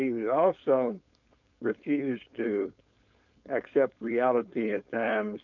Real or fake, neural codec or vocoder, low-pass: fake; codec, 16 kHz, 4.8 kbps, FACodec; 7.2 kHz